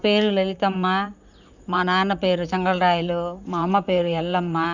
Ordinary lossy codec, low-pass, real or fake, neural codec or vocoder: none; 7.2 kHz; fake; vocoder, 44.1 kHz, 128 mel bands, Pupu-Vocoder